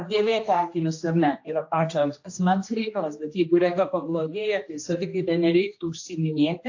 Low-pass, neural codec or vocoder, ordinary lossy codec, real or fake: 7.2 kHz; codec, 16 kHz, 1 kbps, X-Codec, HuBERT features, trained on general audio; AAC, 48 kbps; fake